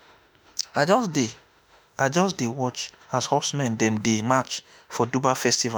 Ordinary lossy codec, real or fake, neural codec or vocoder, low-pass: none; fake; autoencoder, 48 kHz, 32 numbers a frame, DAC-VAE, trained on Japanese speech; none